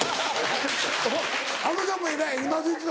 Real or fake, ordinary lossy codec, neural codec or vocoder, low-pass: real; none; none; none